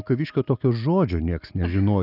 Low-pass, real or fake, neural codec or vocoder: 5.4 kHz; real; none